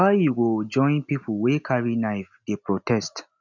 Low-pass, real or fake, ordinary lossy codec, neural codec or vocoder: 7.2 kHz; real; none; none